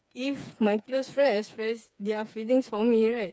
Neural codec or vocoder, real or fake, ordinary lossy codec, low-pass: codec, 16 kHz, 4 kbps, FreqCodec, smaller model; fake; none; none